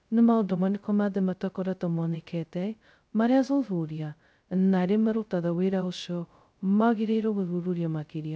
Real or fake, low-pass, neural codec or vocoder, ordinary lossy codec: fake; none; codec, 16 kHz, 0.2 kbps, FocalCodec; none